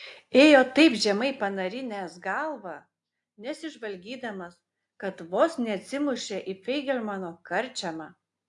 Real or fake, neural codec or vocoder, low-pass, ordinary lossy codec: real; none; 10.8 kHz; AAC, 64 kbps